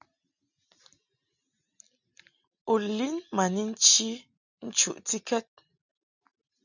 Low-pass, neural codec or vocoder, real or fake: 7.2 kHz; none; real